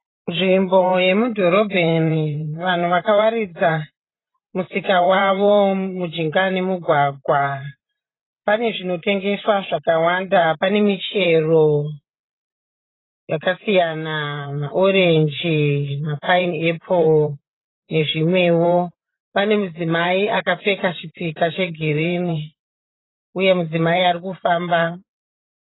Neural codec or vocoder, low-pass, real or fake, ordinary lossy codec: vocoder, 44.1 kHz, 128 mel bands every 512 samples, BigVGAN v2; 7.2 kHz; fake; AAC, 16 kbps